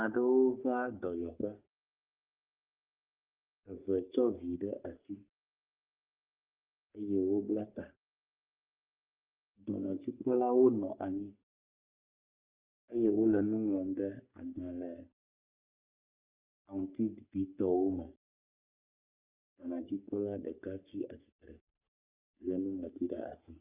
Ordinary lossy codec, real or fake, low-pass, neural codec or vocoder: Opus, 32 kbps; fake; 3.6 kHz; codec, 44.1 kHz, 3.4 kbps, Pupu-Codec